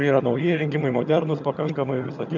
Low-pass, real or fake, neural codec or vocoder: 7.2 kHz; fake; vocoder, 22.05 kHz, 80 mel bands, HiFi-GAN